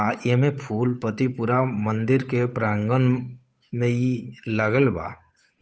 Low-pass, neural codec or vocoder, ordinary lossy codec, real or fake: none; codec, 16 kHz, 8 kbps, FunCodec, trained on Chinese and English, 25 frames a second; none; fake